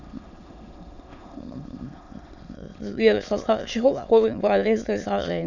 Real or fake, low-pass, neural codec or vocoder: fake; 7.2 kHz; autoencoder, 22.05 kHz, a latent of 192 numbers a frame, VITS, trained on many speakers